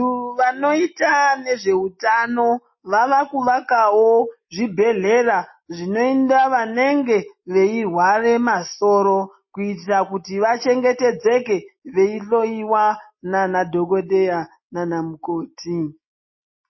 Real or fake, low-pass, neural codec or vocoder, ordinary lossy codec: real; 7.2 kHz; none; MP3, 24 kbps